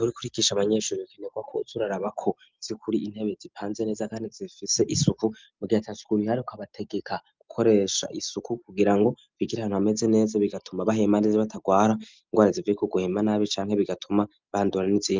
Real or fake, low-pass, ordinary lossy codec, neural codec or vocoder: real; 7.2 kHz; Opus, 24 kbps; none